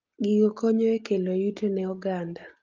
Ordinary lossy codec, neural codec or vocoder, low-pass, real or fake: Opus, 32 kbps; none; 7.2 kHz; real